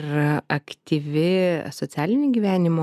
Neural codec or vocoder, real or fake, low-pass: none; real; 14.4 kHz